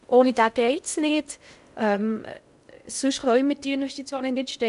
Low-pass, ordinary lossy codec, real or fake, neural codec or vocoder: 10.8 kHz; none; fake; codec, 16 kHz in and 24 kHz out, 0.6 kbps, FocalCodec, streaming, 2048 codes